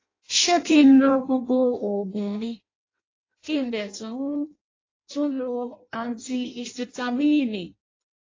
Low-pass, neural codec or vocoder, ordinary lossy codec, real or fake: 7.2 kHz; codec, 16 kHz in and 24 kHz out, 0.6 kbps, FireRedTTS-2 codec; AAC, 32 kbps; fake